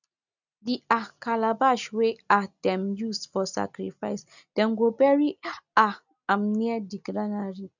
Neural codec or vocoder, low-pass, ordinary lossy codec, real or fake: none; 7.2 kHz; none; real